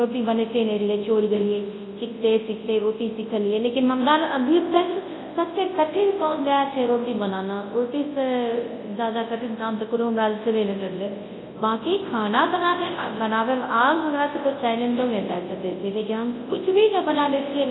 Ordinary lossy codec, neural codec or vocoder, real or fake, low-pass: AAC, 16 kbps; codec, 24 kHz, 0.9 kbps, WavTokenizer, large speech release; fake; 7.2 kHz